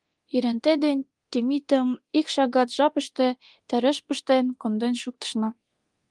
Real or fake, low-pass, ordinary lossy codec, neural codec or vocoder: fake; 10.8 kHz; Opus, 24 kbps; codec, 24 kHz, 0.9 kbps, DualCodec